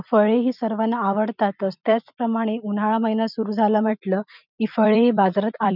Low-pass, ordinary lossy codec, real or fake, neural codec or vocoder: 5.4 kHz; none; fake; vocoder, 44.1 kHz, 128 mel bands every 256 samples, BigVGAN v2